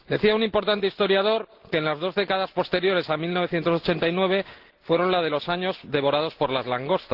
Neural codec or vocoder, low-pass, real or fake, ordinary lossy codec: none; 5.4 kHz; real; Opus, 16 kbps